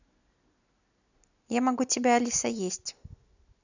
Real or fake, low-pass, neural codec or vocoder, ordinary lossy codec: real; 7.2 kHz; none; none